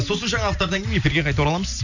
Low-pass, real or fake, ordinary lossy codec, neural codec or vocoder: 7.2 kHz; real; none; none